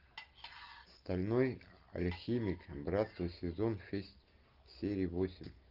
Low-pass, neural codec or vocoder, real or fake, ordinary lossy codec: 5.4 kHz; none; real; Opus, 24 kbps